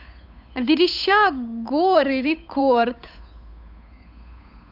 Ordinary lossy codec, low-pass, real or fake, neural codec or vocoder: none; 5.4 kHz; fake; codec, 16 kHz, 8 kbps, FunCodec, trained on Chinese and English, 25 frames a second